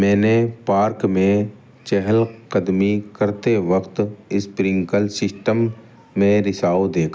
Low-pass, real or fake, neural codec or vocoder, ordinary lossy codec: none; real; none; none